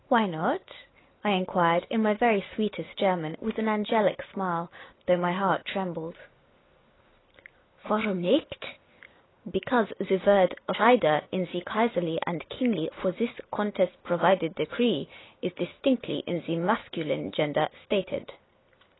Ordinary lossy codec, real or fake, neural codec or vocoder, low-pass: AAC, 16 kbps; real; none; 7.2 kHz